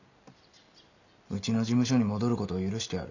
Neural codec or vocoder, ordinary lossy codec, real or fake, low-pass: none; none; real; 7.2 kHz